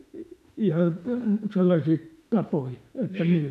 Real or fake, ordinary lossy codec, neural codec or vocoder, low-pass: fake; none; autoencoder, 48 kHz, 32 numbers a frame, DAC-VAE, trained on Japanese speech; 14.4 kHz